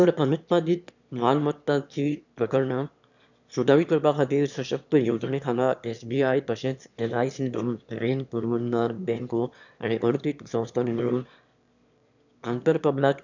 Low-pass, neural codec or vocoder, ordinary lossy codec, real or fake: 7.2 kHz; autoencoder, 22.05 kHz, a latent of 192 numbers a frame, VITS, trained on one speaker; none; fake